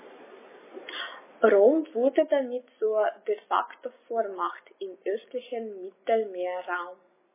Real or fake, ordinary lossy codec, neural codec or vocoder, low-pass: real; MP3, 16 kbps; none; 3.6 kHz